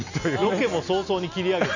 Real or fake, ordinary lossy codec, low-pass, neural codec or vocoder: real; none; 7.2 kHz; none